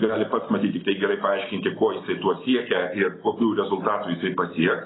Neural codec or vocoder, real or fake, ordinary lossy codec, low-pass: none; real; AAC, 16 kbps; 7.2 kHz